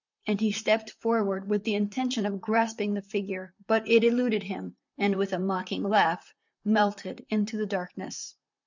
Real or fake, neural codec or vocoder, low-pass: fake; vocoder, 44.1 kHz, 128 mel bands, Pupu-Vocoder; 7.2 kHz